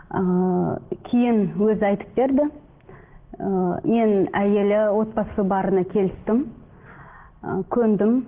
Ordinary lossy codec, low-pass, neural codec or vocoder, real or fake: Opus, 16 kbps; 3.6 kHz; none; real